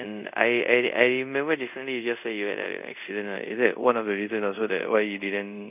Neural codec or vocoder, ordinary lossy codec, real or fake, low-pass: codec, 24 kHz, 0.5 kbps, DualCodec; none; fake; 3.6 kHz